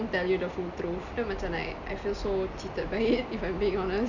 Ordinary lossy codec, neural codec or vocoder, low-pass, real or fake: none; none; 7.2 kHz; real